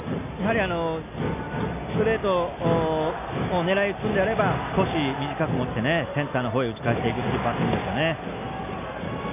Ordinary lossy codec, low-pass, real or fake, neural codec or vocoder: none; 3.6 kHz; real; none